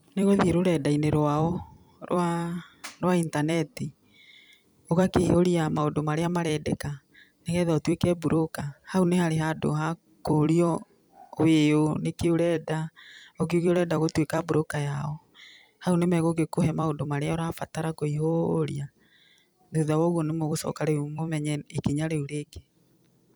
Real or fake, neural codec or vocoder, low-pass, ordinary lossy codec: fake; vocoder, 44.1 kHz, 128 mel bands every 256 samples, BigVGAN v2; none; none